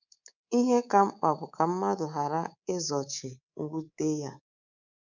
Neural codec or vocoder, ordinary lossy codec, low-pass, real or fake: codec, 24 kHz, 3.1 kbps, DualCodec; none; 7.2 kHz; fake